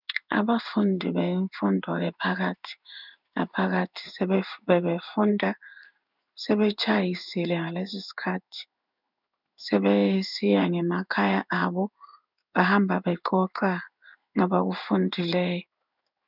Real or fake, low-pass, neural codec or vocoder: fake; 5.4 kHz; codec, 16 kHz in and 24 kHz out, 1 kbps, XY-Tokenizer